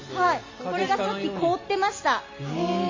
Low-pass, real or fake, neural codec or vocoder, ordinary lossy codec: 7.2 kHz; real; none; MP3, 32 kbps